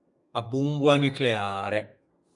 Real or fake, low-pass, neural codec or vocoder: fake; 10.8 kHz; codec, 32 kHz, 1.9 kbps, SNAC